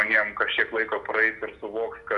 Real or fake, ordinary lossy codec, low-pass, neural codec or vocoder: real; AAC, 64 kbps; 10.8 kHz; none